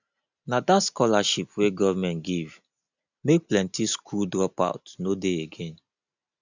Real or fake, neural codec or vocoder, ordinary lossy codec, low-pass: real; none; none; 7.2 kHz